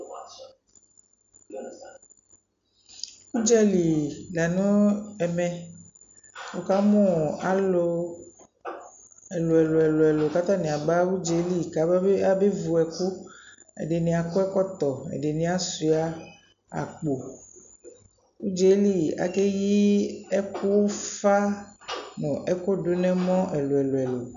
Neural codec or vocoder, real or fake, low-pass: none; real; 7.2 kHz